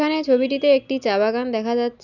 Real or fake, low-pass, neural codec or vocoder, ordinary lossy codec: real; 7.2 kHz; none; none